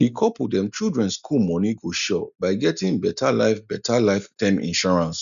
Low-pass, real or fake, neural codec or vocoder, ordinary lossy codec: 7.2 kHz; real; none; none